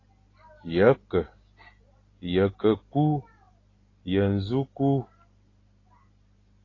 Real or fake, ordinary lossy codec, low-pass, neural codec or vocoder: real; AAC, 32 kbps; 7.2 kHz; none